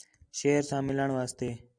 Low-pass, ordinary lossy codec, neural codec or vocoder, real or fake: 9.9 kHz; MP3, 96 kbps; none; real